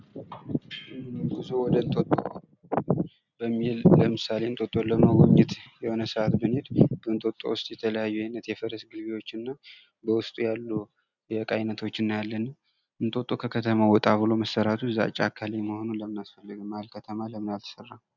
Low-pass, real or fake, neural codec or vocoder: 7.2 kHz; real; none